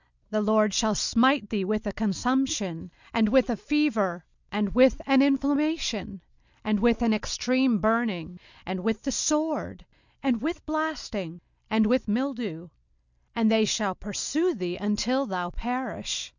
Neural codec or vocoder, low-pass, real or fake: none; 7.2 kHz; real